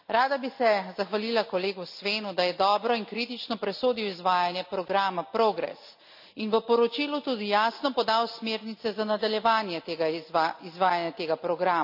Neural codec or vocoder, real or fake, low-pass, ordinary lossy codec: none; real; 5.4 kHz; none